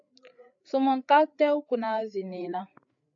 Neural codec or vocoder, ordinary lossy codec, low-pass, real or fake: codec, 16 kHz, 8 kbps, FreqCodec, larger model; AAC, 64 kbps; 7.2 kHz; fake